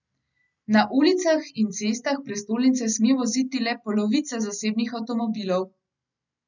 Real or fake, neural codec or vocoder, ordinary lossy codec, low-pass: real; none; none; 7.2 kHz